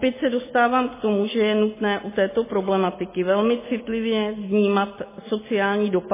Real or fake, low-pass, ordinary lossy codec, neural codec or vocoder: real; 3.6 kHz; MP3, 16 kbps; none